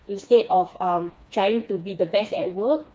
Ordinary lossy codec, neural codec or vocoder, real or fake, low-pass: none; codec, 16 kHz, 2 kbps, FreqCodec, smaller model; fake; none